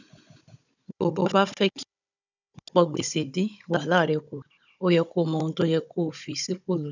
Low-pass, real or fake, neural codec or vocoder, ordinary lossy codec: 7.2 kHz; fake; codec, 16 kHz, 16 kbps, FunCodec, trained on Chinese and English, 50 frames a second; none